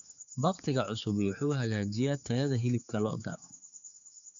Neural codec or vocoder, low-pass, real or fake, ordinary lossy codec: codec, 16 kHz, 4 kbps, X-Codec, HuBERT features, trained on general audio; 7.2 kHz; fake; MP3, 64 kbps